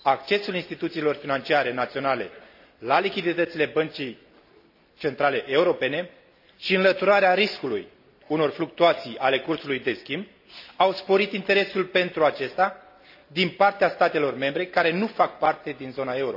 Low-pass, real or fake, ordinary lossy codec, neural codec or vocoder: 5.4 kHz; real; AAC, 48 kbps; none